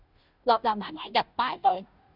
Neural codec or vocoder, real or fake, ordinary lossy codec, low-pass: codec, 16 kHz, 0.5 kbps, FunCodec, trained on Chinese and English, 25 frames a second; fake; Opus, 64 kbps; 5.4 kHz